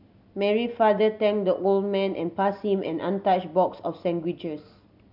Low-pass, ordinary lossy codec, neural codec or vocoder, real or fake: 5.4 kHz; none; none; real